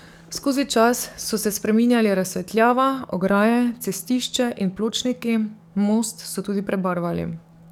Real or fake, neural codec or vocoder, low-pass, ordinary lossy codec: fake; codec, 44.1 kHz, 7.8 kbps, DAC; 19.8 kHz; none